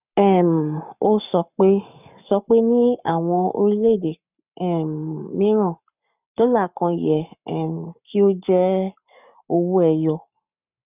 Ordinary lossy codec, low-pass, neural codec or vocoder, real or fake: none; 3.6 kHz; codec, 44.1 kHz, 7.8 kbps, Pupu-Codec; fake